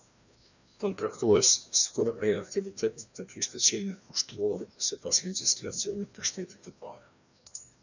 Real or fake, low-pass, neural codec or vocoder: fake; 7.2 kHz; codec, 16 kHz, 1 kbps, FreqCodec, larger model